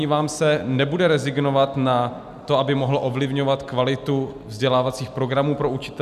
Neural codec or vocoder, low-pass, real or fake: none; 14.4 kHz; real